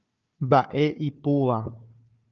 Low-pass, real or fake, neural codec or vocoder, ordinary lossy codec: 7.2 kHz; fake; codec, 16 kHz, 4 kbps, FunCodec, trained on Chinese and English, 50 frames a second; Opus, 24 kbps